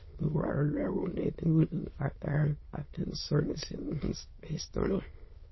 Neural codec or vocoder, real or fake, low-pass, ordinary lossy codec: autoencoder, 22.05 kHz, a latent of 192 numbers a frame, VITS, trained on many speakers; fake; 7.2 kHz; MP3, 24 kbps